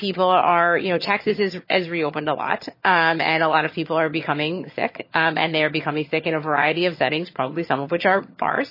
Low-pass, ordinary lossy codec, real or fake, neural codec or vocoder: 5.4 kHz; MP3, 24 kbps; fake; vocoder, 22.05 kHz, 80 mel bands, HiFi-GAN